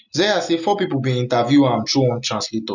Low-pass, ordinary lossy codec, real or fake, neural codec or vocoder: 7.2 kHz; none; real; none